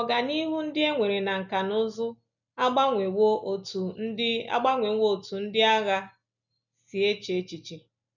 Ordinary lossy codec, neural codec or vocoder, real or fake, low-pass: none; none; real; 7.2 kHz